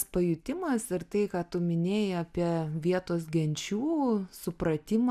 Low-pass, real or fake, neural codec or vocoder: 14.4 kHz; real; none